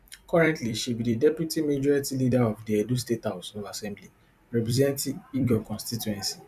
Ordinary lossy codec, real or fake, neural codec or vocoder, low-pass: none; fake; vocoder, 44.1 kHz, 128 mel bands every 256 samples, BigVGAN v2; 14.4 kHz